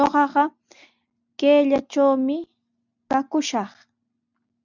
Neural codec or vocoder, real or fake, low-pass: none; real; 7.2 kHz